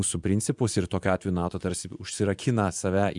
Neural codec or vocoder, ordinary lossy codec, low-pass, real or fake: vocoder, 24 kHz, 100 mel bands, Vocos; MP3, 96 kbps; 10.8 kHz; fake